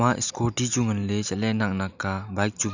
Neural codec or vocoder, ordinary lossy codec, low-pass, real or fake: none; none; 7.2 kHz; real